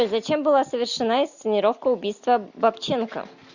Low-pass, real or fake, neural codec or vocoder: 7.2 kHz; real; none